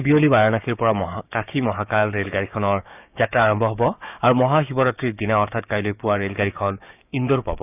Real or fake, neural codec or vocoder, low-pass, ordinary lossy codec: fake; codec, 16 kHz, 6 kbps, DAC; 3.6 kHz; none